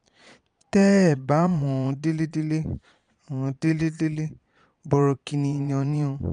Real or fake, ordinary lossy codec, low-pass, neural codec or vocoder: fake; MP3, 96 kbps; 9.9 kHz; vocoder, 22.05 kHz, 80 mel bands, Vocos